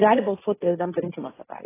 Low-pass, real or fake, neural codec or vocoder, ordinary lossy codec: 3.6 kHz; fake; codec, 16 kHz, 1.1 kbps, Voila-Tokenizer; AAC, 16 kbps